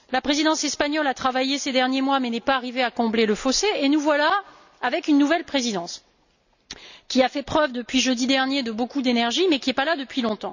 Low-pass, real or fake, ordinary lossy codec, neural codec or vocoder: 7.2 kHz; real; none; none